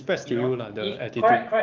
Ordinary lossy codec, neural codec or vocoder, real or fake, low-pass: Opus, 24 kbps; codec, 16 kHz, 6 kbps, DAC; fake; 7.2 kHz